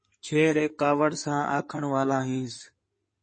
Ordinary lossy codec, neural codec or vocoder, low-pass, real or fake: MP3, 32 kbps; codec, 16 kHz in and 24 kHz out, 2.2 kbps, FireRedTTS-2 codec; 9.9 kHz; fake